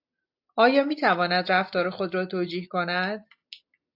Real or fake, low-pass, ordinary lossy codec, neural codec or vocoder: real; 5.4 kHz; AAC, 48 kbps; none